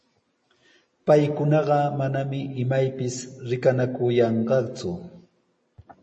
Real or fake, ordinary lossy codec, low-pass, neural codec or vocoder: real; MP3, 32 kbps; 10.8 kHz; none